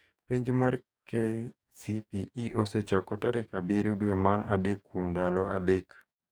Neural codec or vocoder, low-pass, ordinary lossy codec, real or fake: codec, 44.1 kHz, 2.6 kbps, DAC; none; none; fake